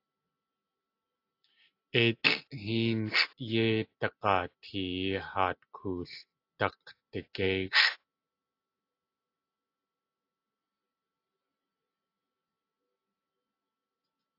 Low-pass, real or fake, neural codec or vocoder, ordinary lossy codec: 5.4 kHz; real; none; AAC, 32 kbps